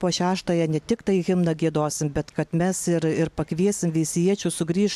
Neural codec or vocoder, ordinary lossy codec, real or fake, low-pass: none; AAC, 96 kbps; real; 14.4 kHz